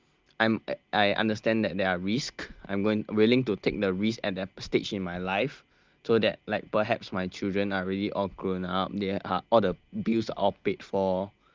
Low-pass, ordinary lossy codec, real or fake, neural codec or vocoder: 7.2 kHz; Opus, 24 kbps; real; none